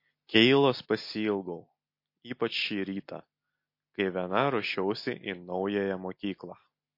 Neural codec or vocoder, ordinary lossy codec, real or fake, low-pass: none; MP3, 32 kbps; real; 5.4 kHz